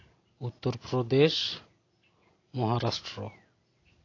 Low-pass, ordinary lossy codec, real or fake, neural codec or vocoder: 7.2 kHz; AAC, 32 kbps; real; none